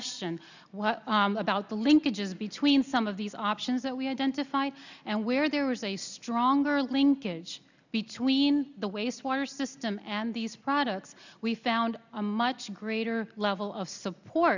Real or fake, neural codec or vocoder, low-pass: real; none; 7.2 kHz